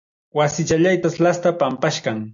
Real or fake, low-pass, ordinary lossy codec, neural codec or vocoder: real; 7.2 kHz; MP3, 64 kbps; none